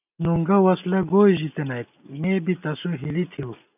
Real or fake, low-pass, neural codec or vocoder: real; 3.6 kHz; none